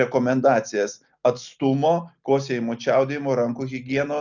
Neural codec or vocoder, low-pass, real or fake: none; 7.2 kHz; real